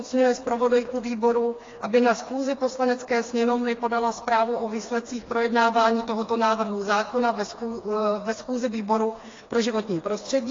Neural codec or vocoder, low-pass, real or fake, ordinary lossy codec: codec, 16 kHz, 2 kbps, FreqCodec, smaller model; 7.2 kHz; fake; AAC, 32 kbps